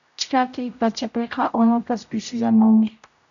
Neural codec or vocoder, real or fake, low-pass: codec, 16 kHz, 0.5 kbps, X-Codec, HuBERT features, trained on general audio; fake; 7.2 kHz